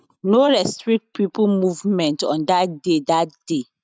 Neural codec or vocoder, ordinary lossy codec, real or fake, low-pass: none; none; real; none